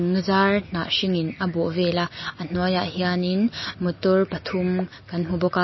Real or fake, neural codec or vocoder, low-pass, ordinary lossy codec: real; none; 7.2 kHz; MP3, 24 kbps